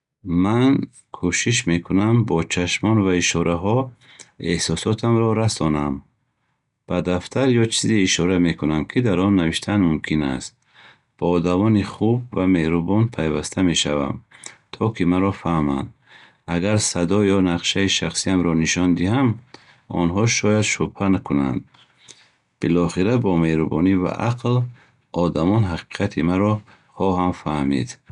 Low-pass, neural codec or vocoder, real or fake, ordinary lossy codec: 10.8 kHz; none; real; none